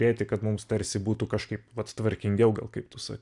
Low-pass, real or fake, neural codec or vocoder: 10.8 kHz; real; none